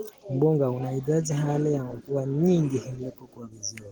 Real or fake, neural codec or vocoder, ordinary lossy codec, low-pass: real; none; Opus, 16 kbps; 19.8 kHz